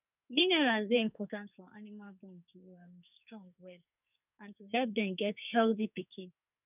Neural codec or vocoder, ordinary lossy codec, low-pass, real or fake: codec, 44.1 kHz, 2.6 kbps, SNAC; none; 3.6 kHz; fake